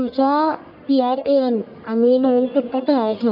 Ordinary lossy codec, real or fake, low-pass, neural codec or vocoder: AAC, 48 kbps; fake; 5.4 kHz; codec, 44.1 kHz, 1.7 kbps, Pupu-Codec